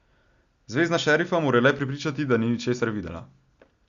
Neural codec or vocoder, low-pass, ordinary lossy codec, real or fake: none; 7.2 kHz; Opus, 64 kbps; real